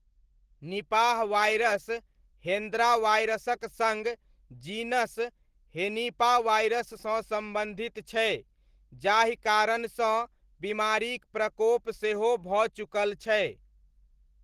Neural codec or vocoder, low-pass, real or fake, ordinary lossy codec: none; 14.4 kHz; real; Opus, 16 kbps